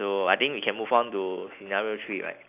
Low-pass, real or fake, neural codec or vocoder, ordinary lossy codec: 3.6 kHz; real; none; none